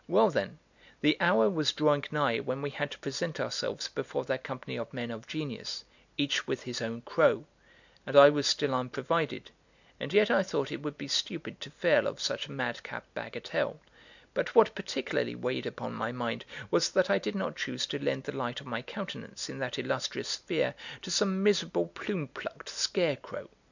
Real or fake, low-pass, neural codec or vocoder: real; 7.2 kHz; none